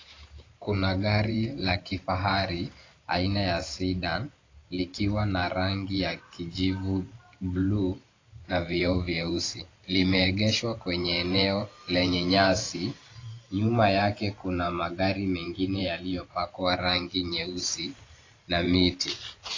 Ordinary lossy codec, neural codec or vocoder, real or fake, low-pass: AAC, 32 kbps; vocoder, 44.1 kHz, 128 mel bands every 512 samples, BigVGAN v2; fake; 7.2 kHz